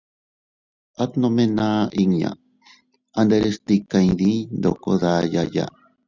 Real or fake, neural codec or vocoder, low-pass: real; none; 7.2 kHz